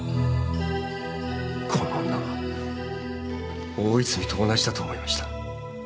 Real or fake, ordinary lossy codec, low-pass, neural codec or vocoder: real; none; none; none